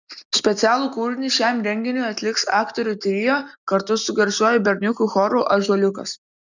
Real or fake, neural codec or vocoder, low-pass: real; none; 7.2 kHz